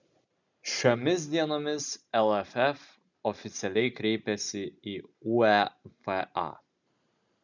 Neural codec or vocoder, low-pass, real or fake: none; 7.2 kHz; real